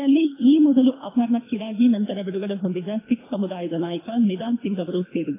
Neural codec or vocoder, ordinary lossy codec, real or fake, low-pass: codec, 24 kHz, 3 kbps, HILCodec; AAC, 16 kbps; fake; 3.6 kHz